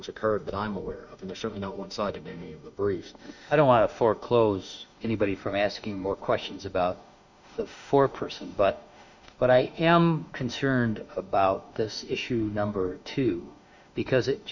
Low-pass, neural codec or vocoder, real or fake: 7.2 kHz; autoencoder, 48 kHz, 32 numbers a frame, DAC-VAE, trained on Japanese speech; fake